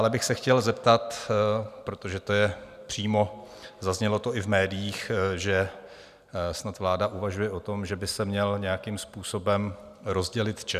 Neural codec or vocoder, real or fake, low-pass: none; real; 14.4 kHz